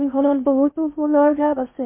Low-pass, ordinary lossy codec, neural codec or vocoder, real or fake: 3.6 kHz; MP3, 24 kbps; codec, 16 kHz in and 24 kHz out, 0.6 kbps, FocalCodec, streaming, 2048 codes; fake